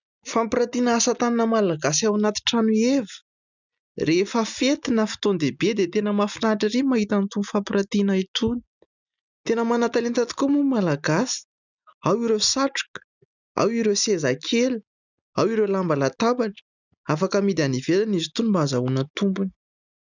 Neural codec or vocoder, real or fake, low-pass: none; real; 7.2 kHz